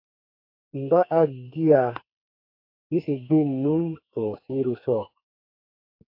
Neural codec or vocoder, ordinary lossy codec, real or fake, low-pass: codec, 44.1 kHz, 2.6 kbps, SNAC; AAC, 32 kbps; fake; 5.4 kHz